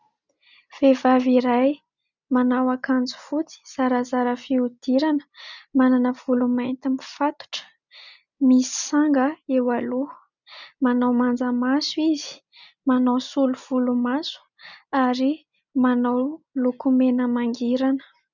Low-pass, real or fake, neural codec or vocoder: 7.2 kHz; real; none